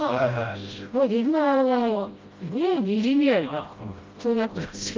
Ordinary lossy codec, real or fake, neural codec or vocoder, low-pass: Opus, 24 kbps; fake; codec, 16 kHz, 0.5 kbps, FreqCodec, smaller model; 7.2 kHz